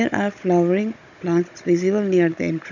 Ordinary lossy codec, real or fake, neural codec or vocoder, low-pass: none; fake; codec, 16 kHz, 16 kbps, FunCodec, trained on Chinese and English, 50 frames a second; 7.2 kHz